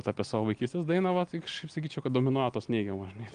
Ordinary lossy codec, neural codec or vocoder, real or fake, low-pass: Opus, 32 kbps; none; real; 9.9 kHz